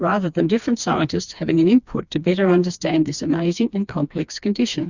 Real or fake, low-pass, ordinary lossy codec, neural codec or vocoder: fake; 7.2 kHz; Opus, 64 kbps; codec, 16 kHz, 2 kbps, FreqCodec, smaller model